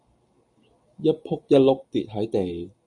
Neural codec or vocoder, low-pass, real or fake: none; 10.8 kHz; real